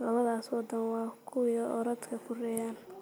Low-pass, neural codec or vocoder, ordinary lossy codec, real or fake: none; none; none; real